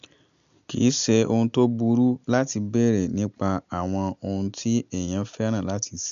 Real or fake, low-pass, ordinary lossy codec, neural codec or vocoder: real; 7.2 kHz; MP3, 96 kbps; none